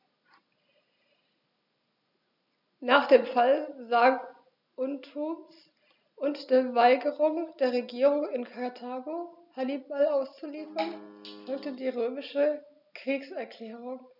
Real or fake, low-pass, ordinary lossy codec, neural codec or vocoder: real; 5.4 kHz; none; none